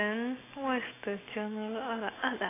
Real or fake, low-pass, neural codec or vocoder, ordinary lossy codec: real; 3.6 kHz; none; AAC, 16 kbps